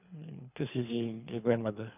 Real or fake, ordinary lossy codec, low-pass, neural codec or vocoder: fake; none; 3.6 kHz; codec, 24 kHz, 3 kbps, HILCodec